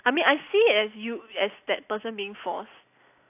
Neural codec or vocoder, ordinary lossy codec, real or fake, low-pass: none; AAC, 32 kbps; real; 3.6 kHz